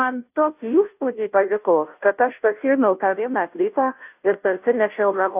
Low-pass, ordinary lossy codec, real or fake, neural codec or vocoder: 3.6 kHz; AAC, 32 kbps; fake; codec, 16 kHz, 0.5 kbps, FunCodec, trained on Chinese and English, 25 frames a second